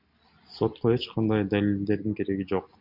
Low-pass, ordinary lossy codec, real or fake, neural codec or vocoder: 5.4 kHz; MP3, 48 kbps; real; none